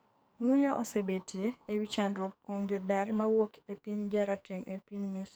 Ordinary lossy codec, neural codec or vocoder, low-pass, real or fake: none; codec, 44.1 kHz, 2.6 kbps, SNAC; none; fake